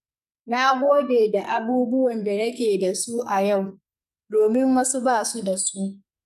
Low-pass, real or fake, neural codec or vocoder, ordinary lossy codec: 14.4 kHz; fake; codec, 44.1 kHz, 2.6 kbps, SNAC; none